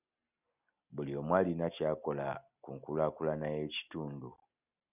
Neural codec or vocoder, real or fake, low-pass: none; real; 3.6 kHz